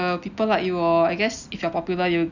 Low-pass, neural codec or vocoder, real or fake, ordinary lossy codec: 7.2 kHz; none; real; none